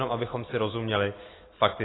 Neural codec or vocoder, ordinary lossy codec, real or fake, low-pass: none; AAC, 16 kbps; real; 7.2 kHz